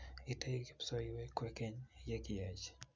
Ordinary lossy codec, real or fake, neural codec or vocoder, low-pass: none; real; none; none